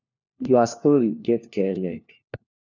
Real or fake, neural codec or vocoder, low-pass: fake; codec, 16 kHz, 1 kbps, FunCodec, trained on LibriTTS, 50 frames a second; 7.2 kHz